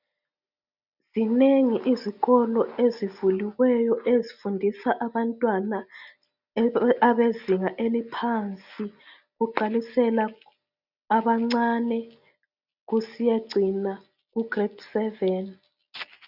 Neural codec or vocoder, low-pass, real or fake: none; 5.4 kHz; real